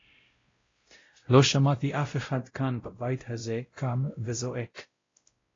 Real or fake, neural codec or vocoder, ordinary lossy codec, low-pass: fake; codec, 16 kHz, 0.5 kbps, X-Codec, WavLM features, trained on Multilingual LibriSpeech; AAC, 32 kbps; 7.2 kHz